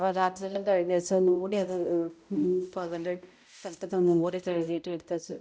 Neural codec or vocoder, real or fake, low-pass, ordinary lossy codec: codec, 16 kHz, 0.5 kbps, X-Codec, HuBERT features, trained on balanced general audio; fake; none; none